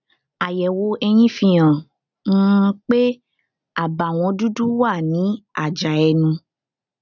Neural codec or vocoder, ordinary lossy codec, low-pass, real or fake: none; none; 7.2 kHz; real